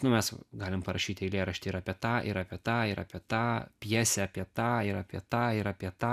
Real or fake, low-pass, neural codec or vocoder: real; 14.4 kHz; none